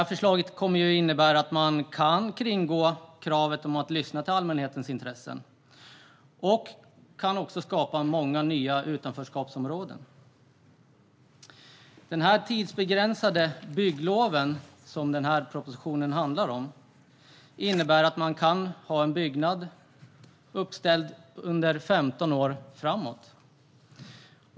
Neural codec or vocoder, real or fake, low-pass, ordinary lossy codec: none; real; none; none